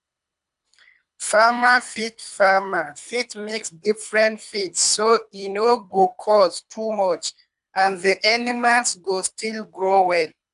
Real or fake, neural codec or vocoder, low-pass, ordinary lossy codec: fake; codec, 24 kHz, 3 kbps, HILCodec; 10.8 kHz; AAC, 96 kbps